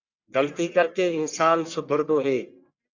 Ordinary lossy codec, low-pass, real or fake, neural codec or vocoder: Opus, 64 kbps; 7.2 kHz; fake; codec, 44.1 kHz, 1.7 kbps, Pupu-Codec